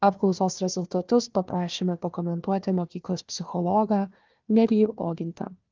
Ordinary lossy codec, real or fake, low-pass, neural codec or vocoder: Opus, 24 kbps; fake; 7.2 kHz; codec, 16 kHz, 1 kbps, FunCodec, trained on Chinese and English, 50 frames a second